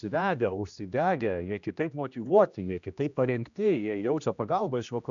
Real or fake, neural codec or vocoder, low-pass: fake; codec, 16 kHz, 1 kbps, X-Codec, HuBERT features, trained on general audio; 7.2 kHz